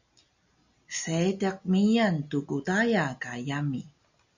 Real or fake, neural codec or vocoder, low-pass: real; none; 7.2 kHz